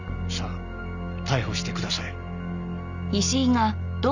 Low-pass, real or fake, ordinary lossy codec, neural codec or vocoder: 7.2 kHz; real; none; none